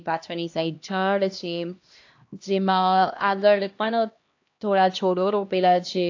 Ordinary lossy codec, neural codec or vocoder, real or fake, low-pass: AAC, 48 kbps; codec, 16 kHz, 1 kbps, X-Codec, HuBERT features, trained on LibriSpeech; fake; 7.2 kHz